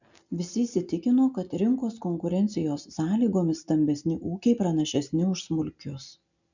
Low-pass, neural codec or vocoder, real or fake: 7.2 kHz; none; real